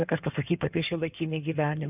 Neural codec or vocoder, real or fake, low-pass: codec, 16 kHz in and 24 kHz out, 2.2 kbps, FireRedTTS-2 codec; fake; 3.6 kHz